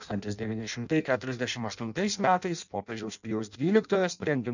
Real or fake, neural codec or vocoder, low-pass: fake; codec, 16 kHz in and 24 kHz out, 0.6 kbps, FireRedTTS-2 codec; 7.2 kHz